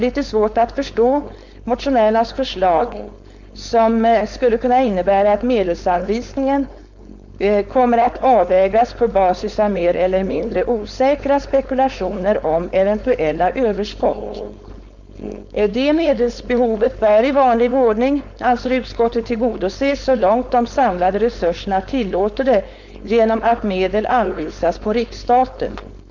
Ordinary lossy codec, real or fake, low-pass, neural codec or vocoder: none; fake; 7.2 kHz; codec, 16 kHz, 4.8 kbps, FACodec